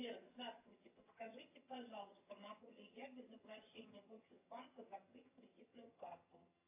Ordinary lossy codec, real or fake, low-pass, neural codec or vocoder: AAC, 24 kbps; fake; 3.6 kHz; vocoder, 22.05 kHz, 80 mel bands, HiFi-GAN